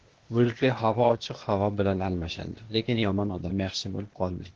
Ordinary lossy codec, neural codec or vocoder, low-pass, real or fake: Opus, 16 kbps; codec, 16 kHz, 0.8 kbps, ZipCodec; 7.2 kHz; fake